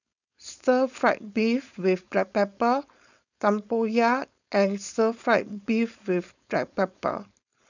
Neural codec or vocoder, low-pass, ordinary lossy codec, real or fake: codec, 16 kHz, 4.8 kbps, FACodec; 7.2 kHz; none; fake